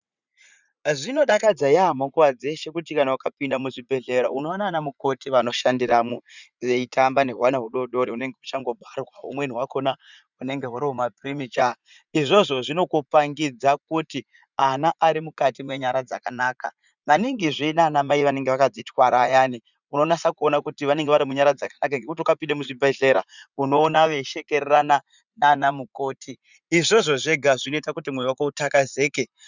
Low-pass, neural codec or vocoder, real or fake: 7.2 kHz; vocoder, 44.1 kHz, 80 mel bands, Vocos; fake